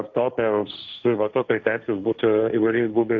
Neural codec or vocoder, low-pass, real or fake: codec, 16 kHz, 1.1 kbps, Voila-Tokenizer; 7.2 kHz; fake